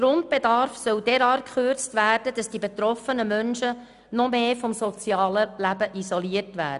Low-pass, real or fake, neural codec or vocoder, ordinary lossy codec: 10.8 kHz; real; none; none